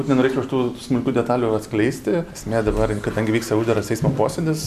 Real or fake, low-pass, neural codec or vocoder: real; 14.4 kHz; none